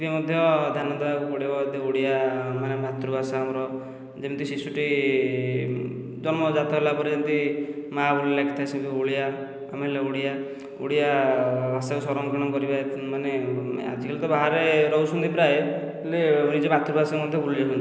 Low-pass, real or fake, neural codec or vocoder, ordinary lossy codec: none; real; none; none